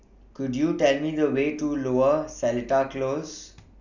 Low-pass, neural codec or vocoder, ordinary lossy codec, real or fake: 7.2 kHz; none; none; real